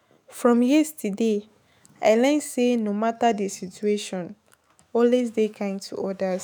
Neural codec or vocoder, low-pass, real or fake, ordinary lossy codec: autoencoder, 48 kHz, 128 numbers a frame, DAC-VAE, trained on Japanese speech; none; fake; none